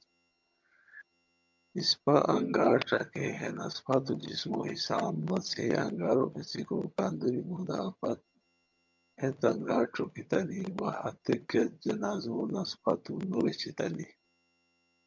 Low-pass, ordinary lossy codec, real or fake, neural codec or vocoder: 7.2 kHz; MP3, 64 kbps; fake; vocoder, 22.05 kHz, 80 mel bands, HiFi-GAN